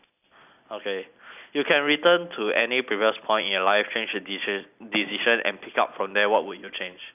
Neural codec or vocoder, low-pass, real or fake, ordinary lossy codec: none; 3.6 kHz; real; none